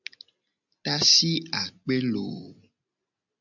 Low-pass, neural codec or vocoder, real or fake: 7.2 kHz; none; real